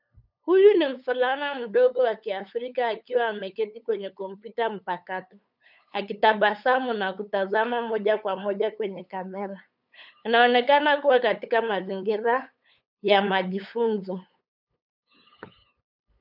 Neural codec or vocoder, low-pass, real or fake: codec, 16 kHz, 8 kbps, FunCodec, trained on LibriTTS, 25 frames a second; 5.4 kHz; fake